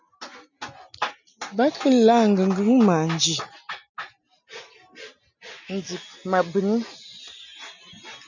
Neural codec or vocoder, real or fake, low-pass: none; real; 7.2 kHz